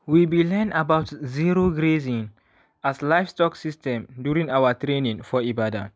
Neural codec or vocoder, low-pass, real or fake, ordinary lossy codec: none; none; real; none